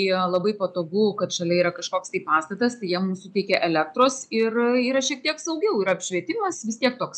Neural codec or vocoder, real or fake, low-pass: none; real; 10.8 kHz